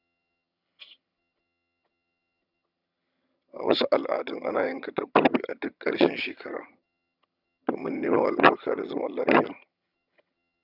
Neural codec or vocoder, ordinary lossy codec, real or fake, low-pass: vocoder, 22.05 kHz, 80 mel bands, HiFi-GAN; none; fake; 5.4 kHz